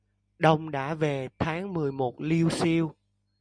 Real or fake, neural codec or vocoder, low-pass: real; none; 9.9 kHz